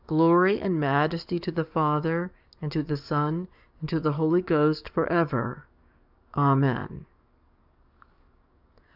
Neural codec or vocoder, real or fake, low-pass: codec, 44.1 kHz, 7.8 kbps, DAC; fake; 5.4 kHz